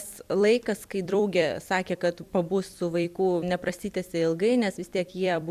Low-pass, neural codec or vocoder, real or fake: 14.4 kHz; vocoder, 44.1 kHz, 128 mel bands every 256 samples, BigVGAN v2; fake